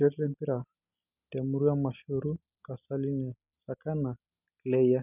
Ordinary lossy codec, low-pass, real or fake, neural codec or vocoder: none; 3.6 kHz; real; none